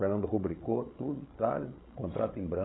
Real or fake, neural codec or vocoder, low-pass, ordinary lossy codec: fake; codec, 16 kHz, 16 kbps, FreqCodec, larger model; 7.2 kHz; AAC, 16 kbps